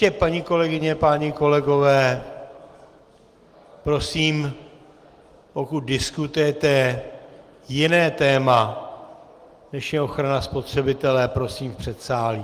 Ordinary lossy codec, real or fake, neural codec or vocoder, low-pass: Opus, 24 kbps; real; none; 14.4 kHz